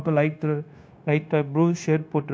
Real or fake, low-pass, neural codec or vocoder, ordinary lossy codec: fake; none; codec, 16 kHz, 0.9 kbps, LongCat-Audio-Codec; none